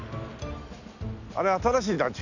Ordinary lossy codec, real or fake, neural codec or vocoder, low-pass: none; real; none; 7.2 kHz